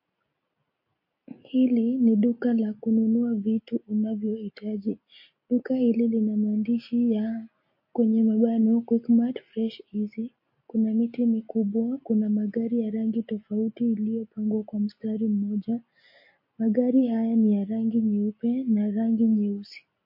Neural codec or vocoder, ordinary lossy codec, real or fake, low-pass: none; MP3, 32 kbps; real; 5.4 kHz